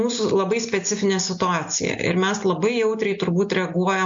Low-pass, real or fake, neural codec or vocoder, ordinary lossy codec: 7.2 kHz; real; none; MP3, 48 kbps